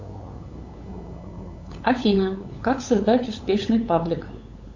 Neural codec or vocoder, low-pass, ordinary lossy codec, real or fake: codec, 16 kHz, 8 kbps, FunCodec, trained on LibriTTS, 25 frames a second; 7.2 kHz; AAC, 48 kbps; fake